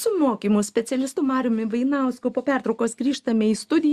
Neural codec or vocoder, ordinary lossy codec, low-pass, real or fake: none; Opus, 64 kbps; 14.4 kHz; real